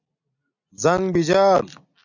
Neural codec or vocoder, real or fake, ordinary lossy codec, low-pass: none; real; AAC, 48 kbps; 7.2 kHz